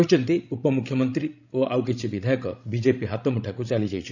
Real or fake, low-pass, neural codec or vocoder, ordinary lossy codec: fake; 7.2 kHz; codec, 16 kHz, 16 kbps, FreqCodec, larger model; none